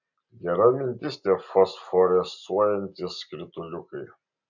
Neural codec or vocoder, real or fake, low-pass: none; real; 7.2 kHz